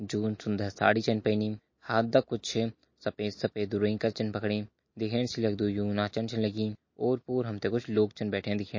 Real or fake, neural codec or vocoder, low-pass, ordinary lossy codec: real; none; 7.2 kHz; MP3, 32 kbps